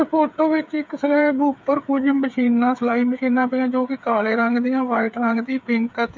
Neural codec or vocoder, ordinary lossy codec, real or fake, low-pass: codec, 16 kHz, 8 kbps, FreqCodec, smaller model; none; fake; none